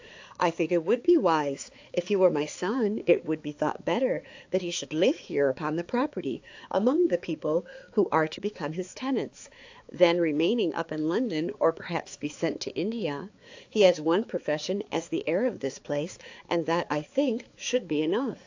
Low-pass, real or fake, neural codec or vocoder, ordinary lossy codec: 7.2 kHz; fake; codec, 16 kHz, 4 kbps, X-Codec, HuBERT features, trained on balanced general audio; AAC, 48 kbps